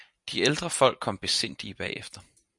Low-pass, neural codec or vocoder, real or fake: 10.8 kHz; none; real